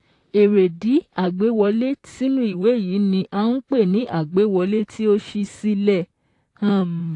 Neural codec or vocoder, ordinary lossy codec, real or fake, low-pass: vocoder, 44.1 kHz, 128 mel bands, Pupu-Vocoder; AAC, 48 kbps; fake; 10.8 kHz